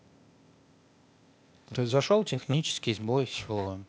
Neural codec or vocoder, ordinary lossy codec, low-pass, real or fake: codec, 16 kHz, 0.8 kbps, ZipCodec; none; none; fake